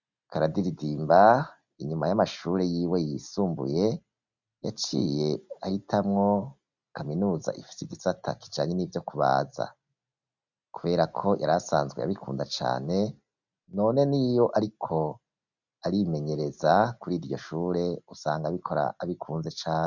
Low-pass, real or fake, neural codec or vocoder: 7.2 kHz; real; none